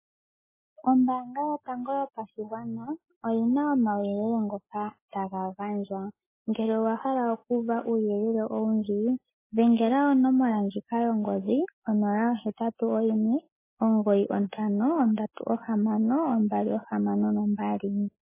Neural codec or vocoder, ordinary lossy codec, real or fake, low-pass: none; MP3, 16 kbps; real; 3.6 kHz